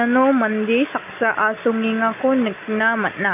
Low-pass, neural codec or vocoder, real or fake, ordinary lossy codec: 3.6 kHz; none; real; none